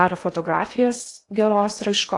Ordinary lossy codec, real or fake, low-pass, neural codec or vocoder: AAC, 48 kbps; fake; 10.8 kHz; codec, 16 kHz in and 24 kHz out, 0.8 kbps, FocalCodec, streaming, 65536 codes